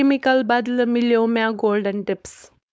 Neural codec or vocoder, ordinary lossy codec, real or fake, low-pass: codec, 16 kHz, 4.8 kbps, FACodec; none; fake; none